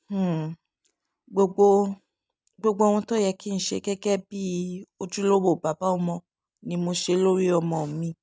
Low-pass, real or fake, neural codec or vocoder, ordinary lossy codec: none; real; none; none